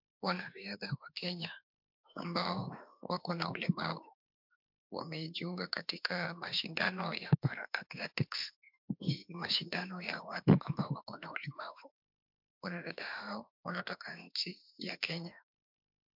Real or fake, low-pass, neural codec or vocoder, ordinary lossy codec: fake; 5.4 kHz; autoencoder, 48 kHz, 32 numbers a frame, DAC-VAE, trained on Japanese speech; MP3, 48 kbps